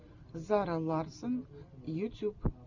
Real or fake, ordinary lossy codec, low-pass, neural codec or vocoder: real; Opus, 64 kbps; 7.2 kHz; none